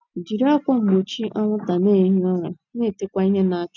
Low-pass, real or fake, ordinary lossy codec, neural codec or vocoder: 7.2 kHz; real; none; none